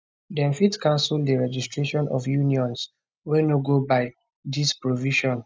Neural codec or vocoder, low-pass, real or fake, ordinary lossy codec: none; none; real; none